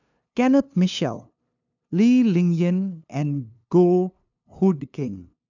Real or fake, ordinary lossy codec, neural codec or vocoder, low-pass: fake; none; codec, 16 kHz, 2 kbps, FunCodec, trained on LibriTTS, 25 frames a second; 7.2 kHz